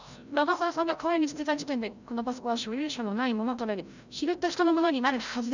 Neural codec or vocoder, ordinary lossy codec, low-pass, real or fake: codec, 16 kHz, 0.5 kbps, FreqCodec, larger model; none; 7.2 kHz; fake